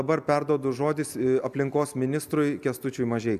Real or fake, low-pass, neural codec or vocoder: real; 14.4 kHz; none